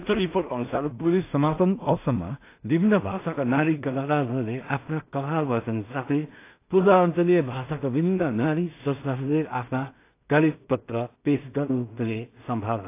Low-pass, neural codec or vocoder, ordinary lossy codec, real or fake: 3.6 kHz; codec, 16 kHz in and 24 kHz out, 0.4 kbps, LongCat-Audio-Codec, two codebook decoder; AAC, 24 kbps; fake